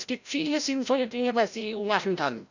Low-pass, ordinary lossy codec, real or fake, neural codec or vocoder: 7.2 kHz; none; fake; codec, 16 kHz, 0.5 kbps, FreqCodec, larger model